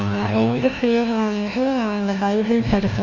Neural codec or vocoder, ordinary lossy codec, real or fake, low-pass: codec, 16 kHz, 1 kbps, FunCodec, trained on LibriTTS, 50 frames a second; none; fake; 7.2 kHz